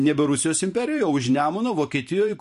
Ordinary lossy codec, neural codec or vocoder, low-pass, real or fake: MP3, 48 kbps; vocoder, 44.1 kHz, 128 mel bands every 512 samples, BigVGAN v2; 14.4 kHz; fake